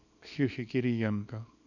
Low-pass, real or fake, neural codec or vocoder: 7.2 kHz; fake; codec, 24 kHz, 0.9 kbps, WavTokenizer, small release